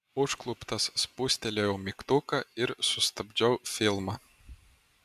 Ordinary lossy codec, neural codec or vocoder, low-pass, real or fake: MP3, 96 kbps; none; 14.4 kHz; real